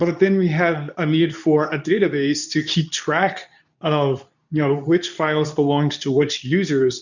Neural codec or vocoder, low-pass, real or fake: codec, 24 kHz, 0.9 kbps, WavTokenizer, medium speech release version 2; 7.2 kHz; fake